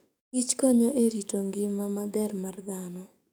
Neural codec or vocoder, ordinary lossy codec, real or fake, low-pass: codec, 44.1 kHz, 7.8 kbps, DAC; none; fake; none